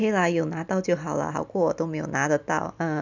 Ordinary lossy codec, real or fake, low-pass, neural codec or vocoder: none; real; 7.2 kHz; none